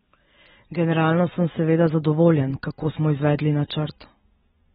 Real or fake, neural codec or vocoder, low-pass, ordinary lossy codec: real; none; 19.8 kHz; AAC, 16 kbps